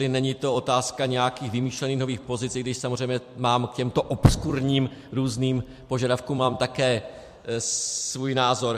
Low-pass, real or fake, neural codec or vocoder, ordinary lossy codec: 14.4 kHz; fake; vocoder, 44.1 kHz, 128 mel bands every 256 samples, BigVGAN v2; MP3, 64 kbps